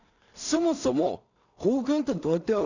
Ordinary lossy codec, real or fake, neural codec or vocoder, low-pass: none; fake; codec, 16 kHz in and 24 kHz out, 0.4 kbps, LongCat-Audio-Codec, two codebook decoder; 7.2 kHz